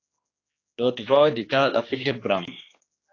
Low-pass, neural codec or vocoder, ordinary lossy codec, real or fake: 7.2 kHz; codec, 16 kHz, 2 kbps, X-Codec, HuBERT features, trained on general audio; AAC, 32 kbps; fake